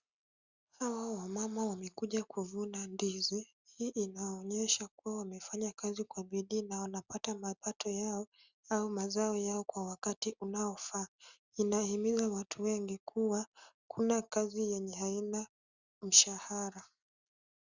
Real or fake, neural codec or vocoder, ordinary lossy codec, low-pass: real; none; Opus, 64 kbps; 7.2 kHz